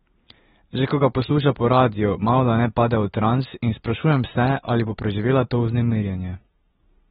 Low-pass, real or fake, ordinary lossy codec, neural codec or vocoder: 19.8 kHz; real; AAC, 16 kbps; none